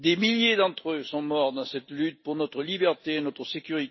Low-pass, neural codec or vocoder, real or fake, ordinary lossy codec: 7.2 kHz; none; real; MP3, 24 kbps